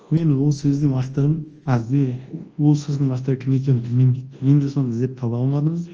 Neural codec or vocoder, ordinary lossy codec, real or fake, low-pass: codec, 24 kHz, 0.9 kbps, WavTokenizer, large speech release; Opus, 24 kbps; fake; 7.2 kHz